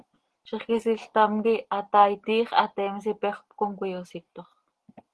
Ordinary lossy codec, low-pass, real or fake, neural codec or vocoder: Opus, 16 kbps; 10.8 kHz; real; none